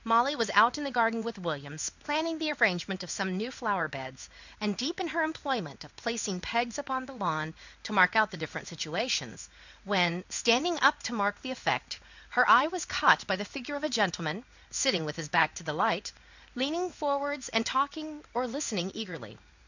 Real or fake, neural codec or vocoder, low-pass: fake; codec, 16 kHz in and 24 kHz out, 1 kbps, XY-Tokenizer; 7.2 kHz